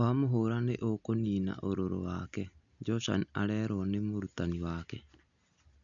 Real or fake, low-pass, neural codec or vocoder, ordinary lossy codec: real; 7.2 kHz; none; none